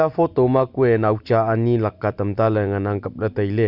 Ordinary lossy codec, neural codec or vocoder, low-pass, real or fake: none; none; 5.4 kHz; real